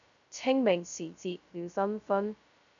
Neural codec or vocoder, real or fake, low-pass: codec, 16 kHz, 0.2 kbps, FocalCodec; fake; 7.2 kHz